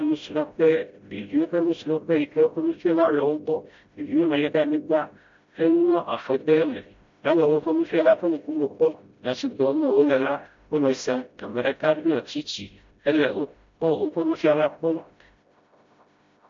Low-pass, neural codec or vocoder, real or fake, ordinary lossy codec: 7.2 kHz; codec, 16 kHz, 0.5 kbps, FreqCodec, smaller model; fake; MP3, 48 kbps